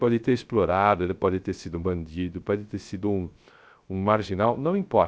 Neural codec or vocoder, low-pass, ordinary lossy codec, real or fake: codec, 16 kHz, 0.3 kbps, FocalCodec; none; none; fake